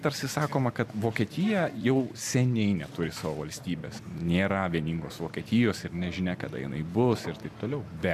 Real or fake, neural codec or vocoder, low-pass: real; none; 14.4 kHz